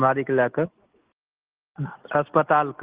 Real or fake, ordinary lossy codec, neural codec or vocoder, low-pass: real; Opus, 16 kbps; none; 3.6 kHz